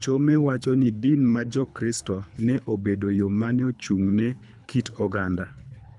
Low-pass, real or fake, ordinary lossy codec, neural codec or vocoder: 10.8 kHz; fake; none; codec, 24 kHz, 3 kbps, HILCodec